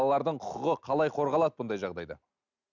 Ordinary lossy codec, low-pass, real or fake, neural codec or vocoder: none; 7.2 kHz; real; none